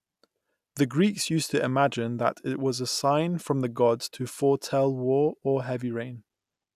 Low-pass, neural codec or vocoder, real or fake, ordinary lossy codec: 14.4 kHz; none; real; none